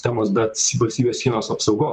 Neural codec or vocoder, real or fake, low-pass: vocoder, 44.1 kHz, 128 mel bands, Pupu-Vocoder; fake; 14.4 kHz